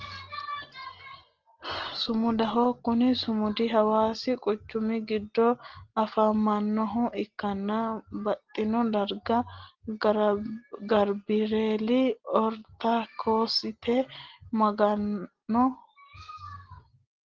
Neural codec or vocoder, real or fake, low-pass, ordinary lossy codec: none; real; 7.2 kHz; Opus, 16 kbps